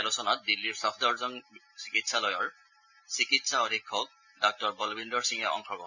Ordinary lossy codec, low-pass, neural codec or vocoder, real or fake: none; 7.2 kHz; none; real